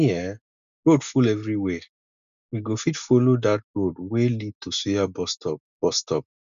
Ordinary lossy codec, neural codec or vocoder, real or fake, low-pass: none; none; real; 7.2 kHz